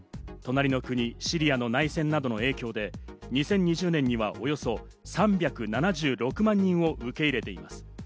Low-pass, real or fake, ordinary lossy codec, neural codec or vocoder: none; real; none; none